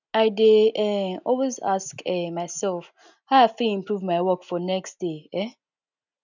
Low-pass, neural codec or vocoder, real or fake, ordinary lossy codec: 7.2 kHz; none; real; none